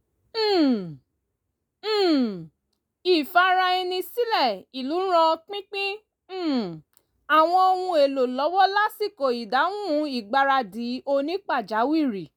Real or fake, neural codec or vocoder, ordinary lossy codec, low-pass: real; none; none; none